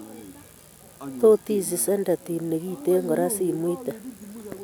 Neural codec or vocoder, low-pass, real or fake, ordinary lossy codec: none; none; real; none